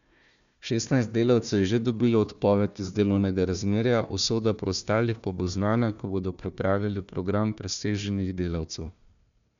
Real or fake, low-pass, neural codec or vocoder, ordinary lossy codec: fake; 7.2 kHz; codec, 16 kHz, 1 kbps, FunCodec, trained on Chinese and English, 50 frames a second; MP3, 96 kbps